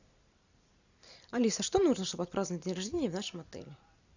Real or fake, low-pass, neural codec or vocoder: real; 7.2 kHz; none